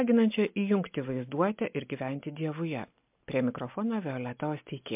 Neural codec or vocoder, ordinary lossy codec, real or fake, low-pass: none; MP3, 32 kbps; real; 3.6 kHz